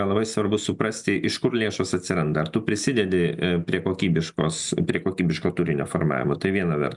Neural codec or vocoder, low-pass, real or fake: none; 10.8 kHz; real